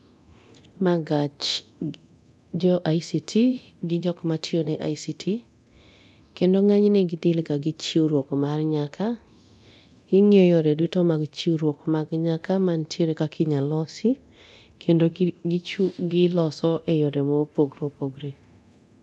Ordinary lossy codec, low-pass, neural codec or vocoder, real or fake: none; none; codec, 24 kHz, 0.9 kbps, DualCodec; fake